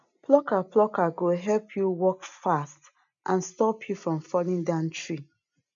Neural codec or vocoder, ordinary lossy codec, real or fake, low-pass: none; none; real; 7.2 kHz